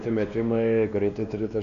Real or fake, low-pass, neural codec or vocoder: fake; 7.2 kHz; codec, 16 kHz, 2 kbps, X-Codec, WavLM features, trained on Multilingual LibriSpeech